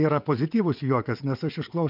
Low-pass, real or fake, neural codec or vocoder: 5.4 kHz; fake; vocoder, 22.05 kHz, 80 mel bands, WaveNeXt